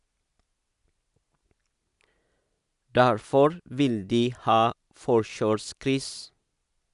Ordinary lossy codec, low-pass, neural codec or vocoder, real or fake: none; 10.8 kHz; none; real